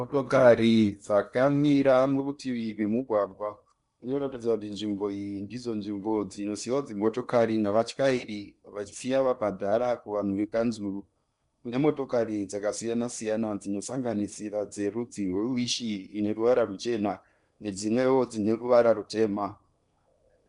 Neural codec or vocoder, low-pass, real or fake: codec, 16 kHz in and 24 kHz out, 0.8 kbps, FocalCodec, streaming, 65536 codes; 10.8 kHz; fake